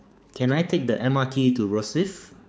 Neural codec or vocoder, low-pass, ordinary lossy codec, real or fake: codec, 16 kHz, 4 kbps, X-Codec, HuBERT features, trained on balanced general audio; none; none; fake